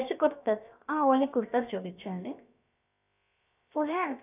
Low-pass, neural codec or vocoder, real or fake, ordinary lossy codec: 3.6 kHz; codec, 16 kHz, about 1 kbps, DyCAST, with the encoder's durations; fake; none